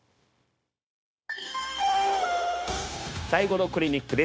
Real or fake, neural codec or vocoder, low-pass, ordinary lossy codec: fake; codec, 16 kHz, 0.9 kbps, LongCat-Audio-Codec; none; none